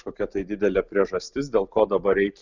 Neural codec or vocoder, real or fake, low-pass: none; real; 7.2 kHz